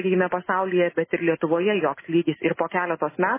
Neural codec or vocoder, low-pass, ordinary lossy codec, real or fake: none; 3.6 kHz; MP3, 16 kbps; real